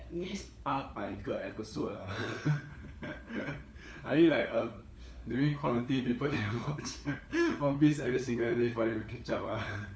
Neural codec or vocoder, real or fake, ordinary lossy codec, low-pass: codec, 16 kHz, 4 kbps, FunCodec, trained on LibriTTS, 50 frames a second; fake; none; none